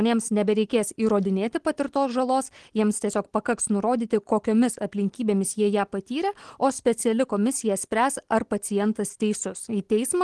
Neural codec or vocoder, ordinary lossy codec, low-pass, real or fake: none; Opus, 16 kbps; 10.8 kHz; real